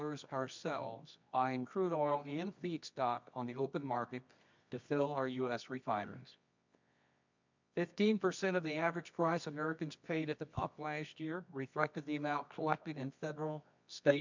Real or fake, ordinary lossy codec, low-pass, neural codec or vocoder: fake; MP3, 64 kbps; 7.2 kHz; codec, 24 kHz, 0.9 kbps, WavTokenizer, medium music audio release